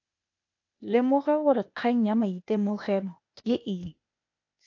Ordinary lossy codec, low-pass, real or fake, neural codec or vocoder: AAC, 48 kbps; 7.2 kHz; fake; codec, 16 kHz, 0.8 kbps, ZipCodec